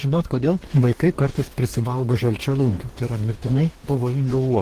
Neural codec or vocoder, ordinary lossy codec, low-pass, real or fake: codec, 44.1 kHz, 2.6 kbps, DAC; Opus, 16 kbps; 14.4 kHz; fake